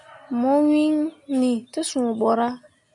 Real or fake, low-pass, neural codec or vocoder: real; 10.8 kHz; none